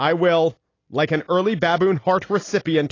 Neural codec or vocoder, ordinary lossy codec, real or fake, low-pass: none; AAC, 32 kbps; real; 7.2 kHz